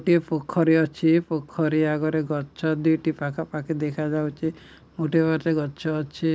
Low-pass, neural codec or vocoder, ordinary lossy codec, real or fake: none; none; none; real